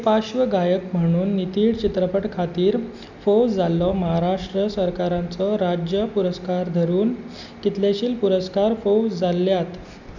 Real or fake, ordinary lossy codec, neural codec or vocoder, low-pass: real; none; none; 7.2 kHz